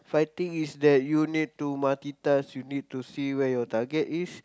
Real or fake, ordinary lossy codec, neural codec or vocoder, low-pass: real; none; none; none